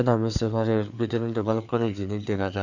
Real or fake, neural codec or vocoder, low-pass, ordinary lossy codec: fake; codec, 16 kHz, 4 kbps, FreqCodec, larger model; 7.2 kHz; none